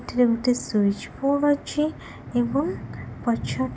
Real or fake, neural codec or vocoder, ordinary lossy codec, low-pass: real; none; none; none